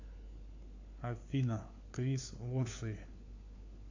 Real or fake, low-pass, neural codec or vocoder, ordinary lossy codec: fake; 7.2 kHz; codec, 16 kHz, 4 kbps, FunCodec, trained on LibriTTS, 50 frames a second; AAC, 48 kbps